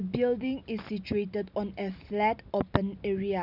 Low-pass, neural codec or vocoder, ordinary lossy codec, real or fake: 5.4 kHz; none; none; real